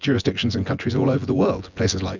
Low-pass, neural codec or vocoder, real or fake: 7.2 kHz; vocoder, 24 kHz, 100 mel bands, Vocos; fake